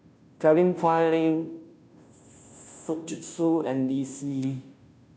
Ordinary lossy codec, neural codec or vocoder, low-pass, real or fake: none; codec, 16 kHz, 0.5 kbps, FunCodec, trained on Chinese and English, 25 frames a second; none; fake